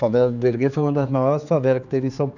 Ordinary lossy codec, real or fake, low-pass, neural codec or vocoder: none; fake; 7.2 kHz; codec, 16 kHz, 4 kbps, X-Codec, HuBERT features, trained on general audio